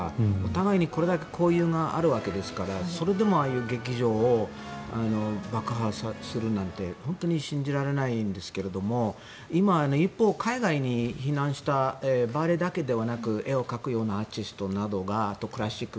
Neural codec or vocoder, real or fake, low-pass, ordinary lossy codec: none; real; none; none